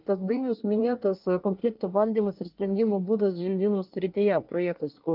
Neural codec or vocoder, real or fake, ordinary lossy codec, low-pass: codec, 24 kHz, 1 kbps, SNAC; fake; Opus, 24 kbps; 5.4 kHz